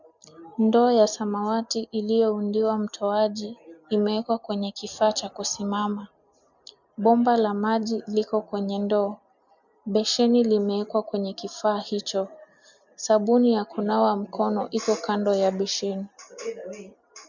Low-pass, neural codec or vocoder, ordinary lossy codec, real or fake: 7.2 kHz; none; MP3, 64 kbps; real